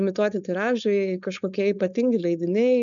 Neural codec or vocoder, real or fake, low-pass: codec, 16 kHz, 4.8 kbps, FACodec; fake; 7.2 kHz